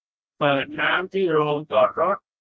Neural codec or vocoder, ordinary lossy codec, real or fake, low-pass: codec, 16 kHz, 1 kbps, FreqCodec, smaller model; none; fake; none